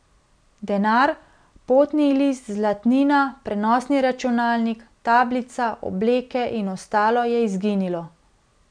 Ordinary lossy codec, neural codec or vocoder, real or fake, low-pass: none; none; real; 9.9 kHz